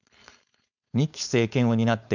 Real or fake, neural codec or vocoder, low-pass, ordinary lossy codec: fake; codec, 16 kHz, 4.8 kbps, FACodec; 7.2 kHz; none